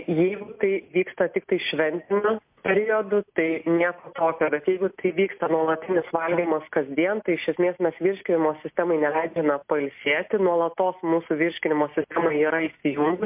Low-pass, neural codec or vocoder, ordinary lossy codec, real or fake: 3.6 kHz; none; MP3, 32 kbps; real